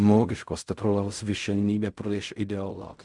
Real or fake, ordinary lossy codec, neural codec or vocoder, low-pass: fake; Opus, 64 kbps; codec, 16 kHz in and 24 kHz out, 0.4 kbps, LongCat-Audio-Codec, fine tuned four codebook decoder; 10.8 kHz